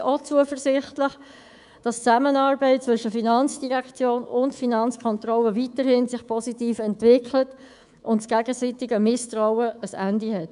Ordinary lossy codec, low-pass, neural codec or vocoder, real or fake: none; 10.8 kHz; codec, 24 kHz, 3.1 kbps, DualCodec; fake